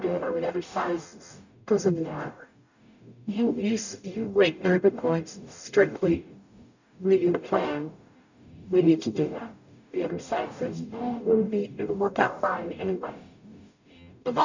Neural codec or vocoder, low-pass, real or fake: codec, 44.1 kHz, 0.9 kbps, DAC; 7.2 kHz; fake